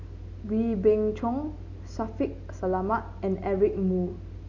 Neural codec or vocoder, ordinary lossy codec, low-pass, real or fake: none; Opus, 64 kbps; 7.2 kHz; real